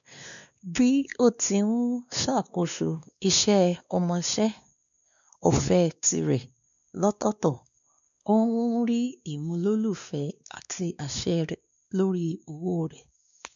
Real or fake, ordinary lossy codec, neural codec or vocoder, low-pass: fake; MP3, 96 kbps; codec, 16 kHz, 2 kbps, X-Codec, WavLM features, trained on Multilingual LibriSpeech; 7.2 kHz